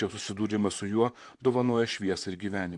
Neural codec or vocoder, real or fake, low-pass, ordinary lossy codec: vocoder, 44.1 kHz, 128 mel bands, Pupu-Vocoder; fake; 10.8 kHz; AAC, 64 kbps